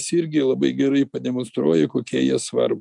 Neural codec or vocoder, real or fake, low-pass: none; real; 10.8 kHz